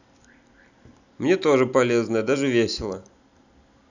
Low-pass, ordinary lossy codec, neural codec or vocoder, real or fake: 7.2 kHz; none; none; real